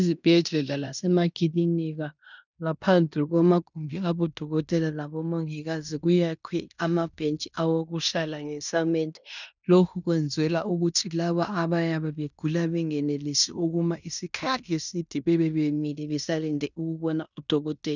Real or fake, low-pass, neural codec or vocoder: fake; 7.2 kHz; codec, 16 kHz in and 24 kHz out, 0.9 kbps, LongCat-Audio-Codec, fine tuned four codebook decoder